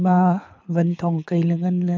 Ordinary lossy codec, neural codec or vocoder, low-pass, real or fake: MP3, 64 kbps; codec, 24 kHz, 6 kbps, HILCodec; 7.2 kHz; fake